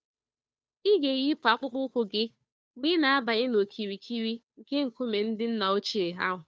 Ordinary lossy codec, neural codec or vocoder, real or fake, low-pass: none; codec, 16 kHz, 2 kbps, FunCodec, trained on Chinese and English, 25 frames a second; fake; none